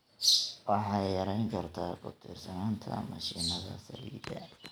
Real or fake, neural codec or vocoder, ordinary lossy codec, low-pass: real; none; none; none